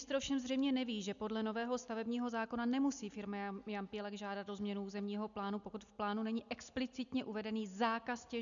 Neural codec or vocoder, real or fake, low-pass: none; real; 7.2 kHz